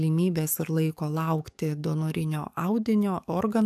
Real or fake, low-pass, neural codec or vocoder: fake; 14.4 kHz; codec, 44.1 kHz, 7.8 kbps, DAC